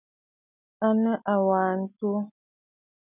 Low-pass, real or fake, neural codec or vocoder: 3.6 kHz; real; none